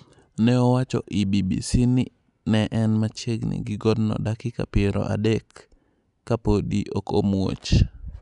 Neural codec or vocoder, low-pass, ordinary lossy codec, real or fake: none; 10.8 kHz; none; real